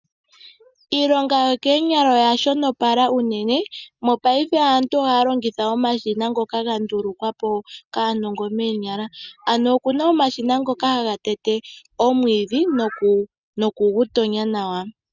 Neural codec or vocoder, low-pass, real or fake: none; 7.2 kHz; real